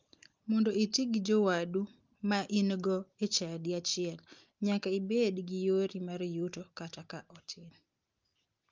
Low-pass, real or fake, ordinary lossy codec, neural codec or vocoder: 7.2 kHz; real; Opus, 32 kbps; none